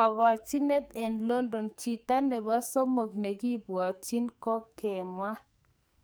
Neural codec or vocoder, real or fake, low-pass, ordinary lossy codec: codec, 44.1 kHz, 2.6 kbps, SNAC; fake; none; none